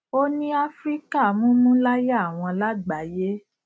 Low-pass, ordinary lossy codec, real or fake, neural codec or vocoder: none; none; real; none